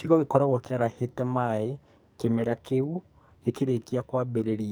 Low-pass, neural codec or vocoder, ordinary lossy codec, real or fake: none; codec, 44.1 kHz, 2.6 kbps, SNAC; none; fake